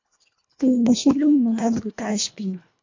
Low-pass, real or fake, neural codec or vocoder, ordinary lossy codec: 7.2 kHz; fake; codec, 24 kHz, 1.5 kbps, HILCodec; MP3, 48 kbps